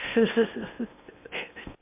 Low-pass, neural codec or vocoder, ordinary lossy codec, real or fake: 3.6 kHz; codec, 16 kHz in and 24 kHz out, 0.8 kbps, FocalCodec, streaming, 65536 codes; MP3, 32 kbps; fake